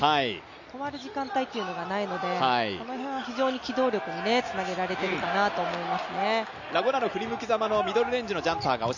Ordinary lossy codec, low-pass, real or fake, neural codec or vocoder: none; 7.2 kHz; real; none